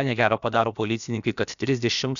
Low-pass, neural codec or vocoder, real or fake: 7.2 kHz; codec, 16 kHz, about 1 kbps, DyCAST, with the encoder's durations; fake